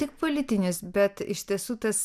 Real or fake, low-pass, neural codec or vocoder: real; 14.4 kHz; none